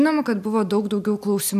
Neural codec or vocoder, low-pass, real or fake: none; 14.4 kHz; real